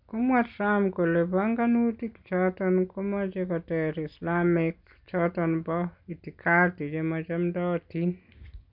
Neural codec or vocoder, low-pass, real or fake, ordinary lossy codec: none; 5.4 kHz; real; none